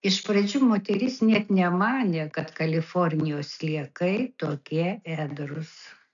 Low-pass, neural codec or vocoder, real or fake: 7.2 kHz; none; real